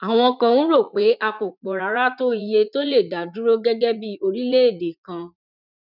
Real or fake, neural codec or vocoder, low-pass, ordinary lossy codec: fake; vocoder, 44.1 kHz, 80 mel bands, Vocos; 5.4 kHz; none